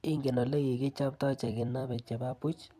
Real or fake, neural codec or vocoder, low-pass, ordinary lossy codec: fake; vocoder, 44.1 kHz, 128 mel bands every 256 samples, BigVGAN v2; 14.4 kHz; none